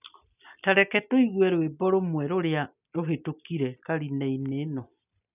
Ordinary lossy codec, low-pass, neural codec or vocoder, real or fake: none; 3.6 kHz; none; real